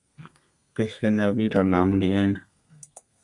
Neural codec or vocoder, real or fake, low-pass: codec, 32 kHz, 1.9 kbps, SNAC; fake; 10.8 kHz